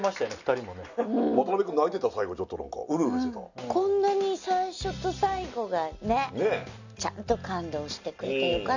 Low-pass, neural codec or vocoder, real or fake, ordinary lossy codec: 7.2 kHz; none; real; none